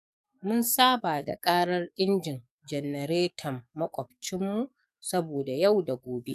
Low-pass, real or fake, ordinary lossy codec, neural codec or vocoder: 14.4 kHz; fake; none; codec, 44.1 kHz, 7.8 kbps, DAC